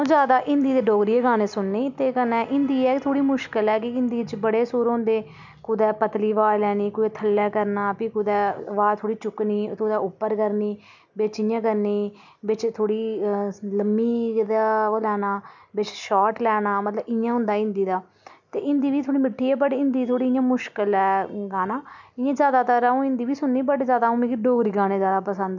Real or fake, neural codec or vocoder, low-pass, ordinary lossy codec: real; none; 7.2 kHz; none